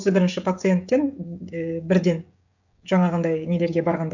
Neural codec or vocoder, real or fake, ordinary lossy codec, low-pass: codec, 16 kHz, 16 kbps, FreqCodec, smaller model; fake; none; 7.2 kHz